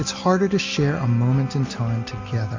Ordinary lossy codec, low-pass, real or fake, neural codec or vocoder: MP3, 48 kbps; 7.2 kHz; real; none